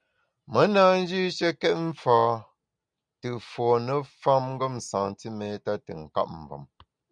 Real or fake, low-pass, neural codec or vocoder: real; 9.9 kHz; none